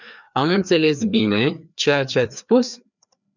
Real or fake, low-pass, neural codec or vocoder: fake; 7.2 kHz; codec, 16 kHz, 2 kbps, FreqCodec, larger model